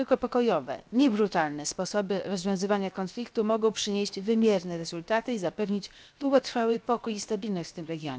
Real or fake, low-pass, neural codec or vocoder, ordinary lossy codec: fake; none; codec, 16 kHz, 0.7 kbps, FocalCodec; none